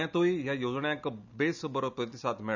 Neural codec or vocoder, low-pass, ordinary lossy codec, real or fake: none; 7.2 kHz; none; real